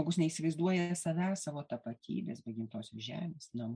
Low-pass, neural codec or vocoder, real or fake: 9.9 kHz; none; real